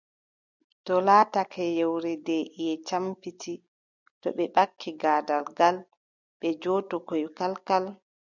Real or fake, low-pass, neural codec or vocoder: real; 7.2 kHz; none